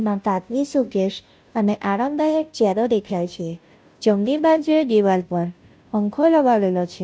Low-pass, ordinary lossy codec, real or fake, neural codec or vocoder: none; none; fake; codec, 16 kHz, 0.5 kbps, FunCodec, trained on Chinese and English, 25 frames a second